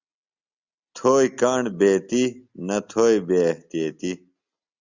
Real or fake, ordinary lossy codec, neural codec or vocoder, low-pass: real; Opus, 64 kbps; none; 7.2 kHz